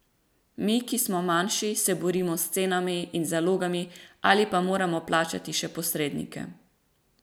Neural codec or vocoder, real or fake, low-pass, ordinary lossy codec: none; real; none; none